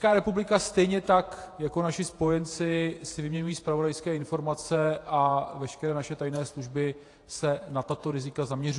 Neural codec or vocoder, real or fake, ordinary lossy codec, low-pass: vocoder, 48 kHz, 128 mel bands, Vocos; fake; AAC, 48 kbps; 10.8 kHz